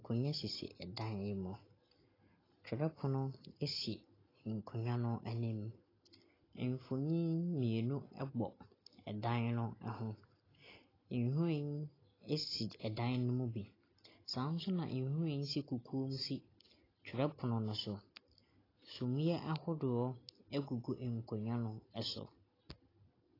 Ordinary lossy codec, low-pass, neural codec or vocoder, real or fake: AAC, 24 kbps; 5.4 kHz; none; real